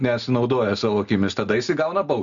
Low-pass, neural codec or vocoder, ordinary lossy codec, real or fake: 7.2 kHz; none; MP3, 96 kbps; real